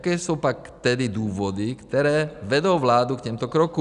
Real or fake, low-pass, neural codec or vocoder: real; 10.8 kHz; none